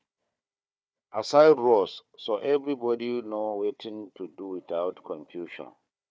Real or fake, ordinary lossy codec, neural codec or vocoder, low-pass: fake; none; codec, 16 kHz, 4 kbps, FunCodec, trained on Chinese and English, 50 frames a second; none